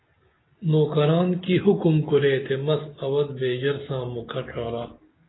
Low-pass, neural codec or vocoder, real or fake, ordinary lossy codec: 7.2 kHz; none; real; AAC, 16 kbps